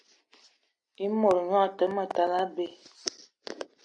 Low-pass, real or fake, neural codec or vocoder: 9.9 kHz; real; none